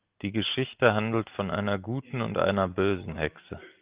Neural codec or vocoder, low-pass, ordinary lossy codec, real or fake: none; 3.6 kHz; Opus, 32 kbps; real